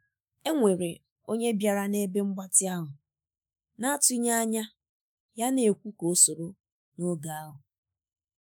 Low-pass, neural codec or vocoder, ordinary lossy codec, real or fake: none; autoencoder, 48 kHz, 128 numbers a frame, DAC-VAE, trained on Japanese speech; none; fake